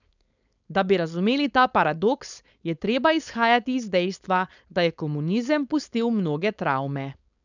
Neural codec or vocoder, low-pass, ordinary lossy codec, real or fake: codec, 16 kHz, 4.8 kbps, FACodec; 7.2 kHz; none; fake